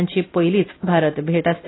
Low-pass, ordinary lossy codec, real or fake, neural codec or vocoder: 7.2 kHz; AAC, 16 kbps; real; none